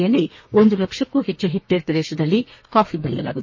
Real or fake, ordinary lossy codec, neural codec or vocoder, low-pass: fake; MP3, 32 kbps; codec, 32 kHz, 1.9 kbps, SNAC; 7.2 kHz